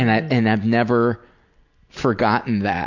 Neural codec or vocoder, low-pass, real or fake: none; 7.2 kHz; real